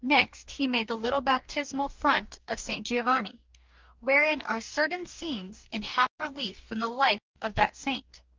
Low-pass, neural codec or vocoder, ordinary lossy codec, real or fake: 7.2 kHz; codec, 44.1 kHz, 2.6 kbps, DAC; Opus, 24 kbps; fake